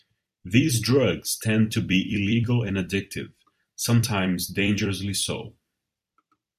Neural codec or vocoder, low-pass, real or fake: vocoder, 44.1 kHz, 128 mel bands every 256 samples, BigVGAN v2; 14.4 kHz; fake